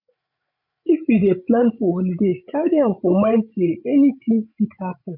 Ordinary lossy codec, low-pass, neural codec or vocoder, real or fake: none; 5.4 kHz; codec, 16 kHz, 16 kbps, FreqCodec, larger model; fake